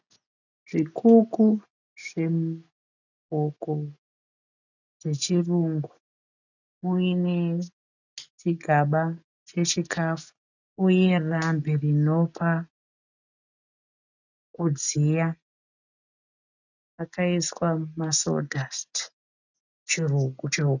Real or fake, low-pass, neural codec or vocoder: real; 7.2 kHz; none